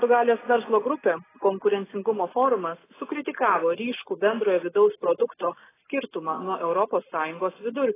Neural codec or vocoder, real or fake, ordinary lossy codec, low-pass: none; real; AAC, 16 kbps; 3.6 kHz